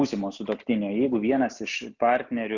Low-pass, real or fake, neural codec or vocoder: 7.2 kHz; real; none